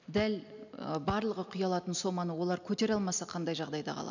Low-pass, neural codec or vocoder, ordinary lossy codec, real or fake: 7.2 kHz; none; none; real